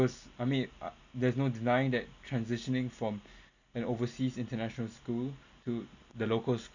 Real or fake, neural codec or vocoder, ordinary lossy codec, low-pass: real; none; none; 7.2 kHz